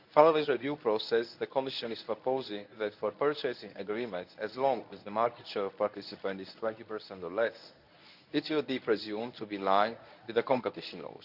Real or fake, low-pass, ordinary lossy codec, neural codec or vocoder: fake; 5.4 kHz; none; codec, 24 kHz, 0.9 kbps, WavTokenizer, medium speech release version 1